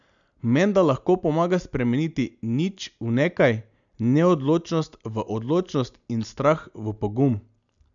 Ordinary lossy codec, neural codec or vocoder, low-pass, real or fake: none; none; 7.2 kHz; real